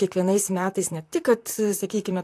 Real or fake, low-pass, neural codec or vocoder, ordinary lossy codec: fake; 14.4 kHz; vocoder, 44.1 kHz, 128 mel bands, Pupu-Vocoder; AAC, 64 kbps